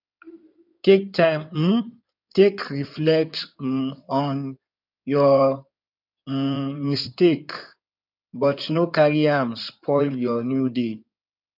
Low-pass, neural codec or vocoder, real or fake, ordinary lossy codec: 5.4 kHz; codec, 16 kHz in and 24 kHz out, 2.2 kbps, FireRedTTS-2 codec; fake; none